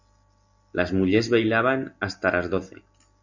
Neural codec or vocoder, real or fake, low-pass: none; real; 7.2 kHz